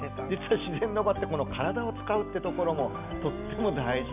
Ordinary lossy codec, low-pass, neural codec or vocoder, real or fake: none; 3.6 kHz; none; real